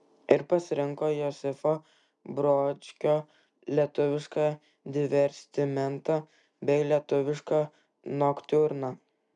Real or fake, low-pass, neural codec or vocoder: real; 10.8 kHz; none